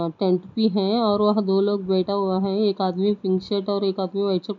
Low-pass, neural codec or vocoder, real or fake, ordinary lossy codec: 7.2 kHz; none; real; none